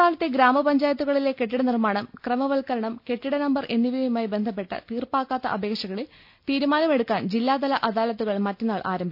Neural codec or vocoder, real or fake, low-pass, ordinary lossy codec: none; real; 5.4 kHz; none